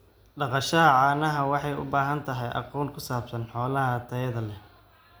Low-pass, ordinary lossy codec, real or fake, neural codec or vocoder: none; none; real; none